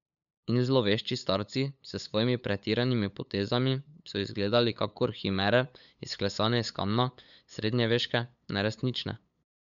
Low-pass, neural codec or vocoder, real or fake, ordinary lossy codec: 7.2 kHz; codec, 16 kHz, 8 kbps, FunCodec, trained on LibriTTS, 25 frames a second; fake; none